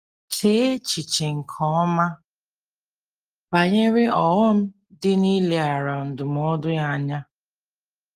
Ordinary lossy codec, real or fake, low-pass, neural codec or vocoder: Opus, 24 kbps; real; 14.4 kHz; none